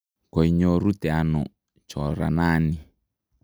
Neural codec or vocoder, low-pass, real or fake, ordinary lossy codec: none; none; real; none